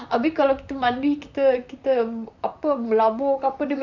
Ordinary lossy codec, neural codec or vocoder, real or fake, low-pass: none; vocoder, 44.1 kHz, 128 mel bands, Pupu-Vocoder; fake; 7.2 kHz